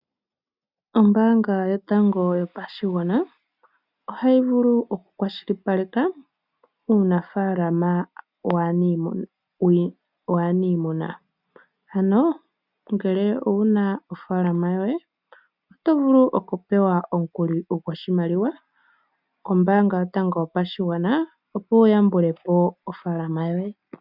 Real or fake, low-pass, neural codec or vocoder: real; 5.4 kHz; none